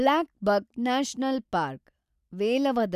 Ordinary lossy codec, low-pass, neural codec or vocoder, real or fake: none; 14.4 kHz; none; real